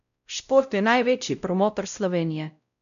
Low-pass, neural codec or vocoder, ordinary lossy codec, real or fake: 7.2 kHz; codec, 16 kHz, 0.5 kbps, X-Codec, WavLM features, trained on Multilingual LibriSpeech; none; fake